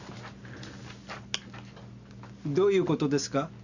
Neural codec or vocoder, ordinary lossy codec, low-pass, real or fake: none; none; 7.2 kHz; real